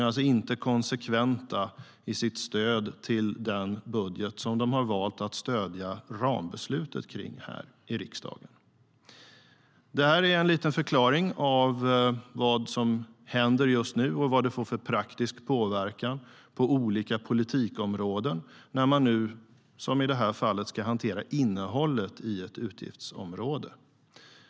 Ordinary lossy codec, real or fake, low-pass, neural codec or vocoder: none; real; none; none